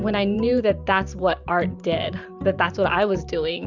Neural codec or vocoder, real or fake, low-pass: vocoder, 44.1 kHz, 128 mel bands every 512 samples, BigVGAN v2; fake; 7.2 kHz